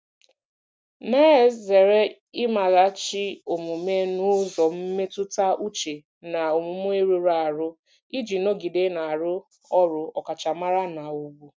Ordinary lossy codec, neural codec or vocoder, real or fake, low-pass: none; none; real; none